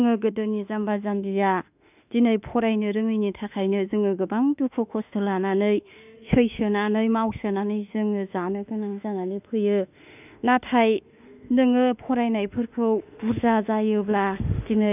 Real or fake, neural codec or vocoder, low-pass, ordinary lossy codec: fake; autoencoder, 48 kHz, 32 numbers a frame, DAC-VAE, trained on Japanese speech; 3.6 kHz; none